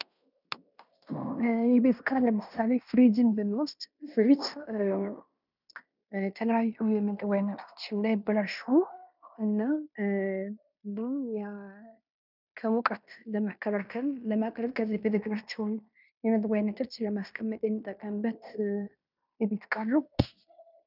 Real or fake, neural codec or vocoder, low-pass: fake; codec, 16 kHz in and 24 kHz out, 0.9 kbps, LongCat-Audio-Codec, fine tuned four codebook decoder; 5.4 kHz